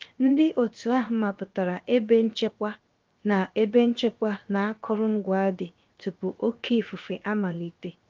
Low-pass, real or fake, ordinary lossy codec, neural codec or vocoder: 7.2 kHz; fake; Opus, 24 kbps; codec, 16 kHz, 0.7 kbps, FocalCodec